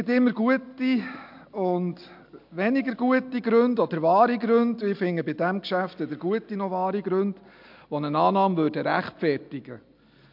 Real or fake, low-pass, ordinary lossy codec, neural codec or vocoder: real; 5.4 kHz; MP3, 48 kbps; none